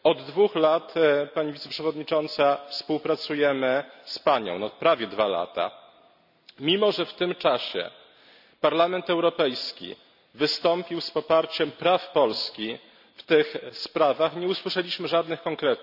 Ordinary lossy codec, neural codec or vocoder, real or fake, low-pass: none; none; real; 5.4 kHz